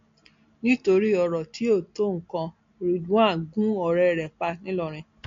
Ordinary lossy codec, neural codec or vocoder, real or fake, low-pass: AAC, 48 kbps; none; real; 7.2 kHz